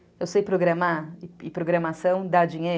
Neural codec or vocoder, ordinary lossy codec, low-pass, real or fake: none; none; none; real